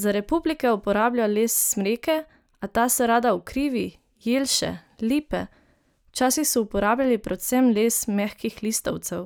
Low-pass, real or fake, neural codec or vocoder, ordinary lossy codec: none; real; none; none